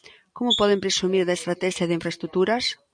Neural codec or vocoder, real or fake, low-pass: none; real; 9.9 kHz